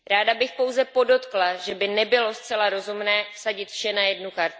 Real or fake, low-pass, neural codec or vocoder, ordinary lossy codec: real; none; none; none